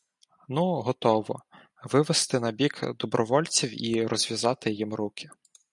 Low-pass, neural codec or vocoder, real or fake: 10.8 kHz; none; real